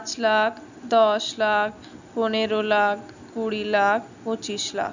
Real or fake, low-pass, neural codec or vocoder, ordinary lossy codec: real; 7.2 kHz; none; none